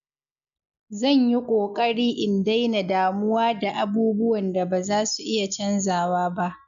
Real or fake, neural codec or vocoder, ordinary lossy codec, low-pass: real; none; none; 7.2 kHz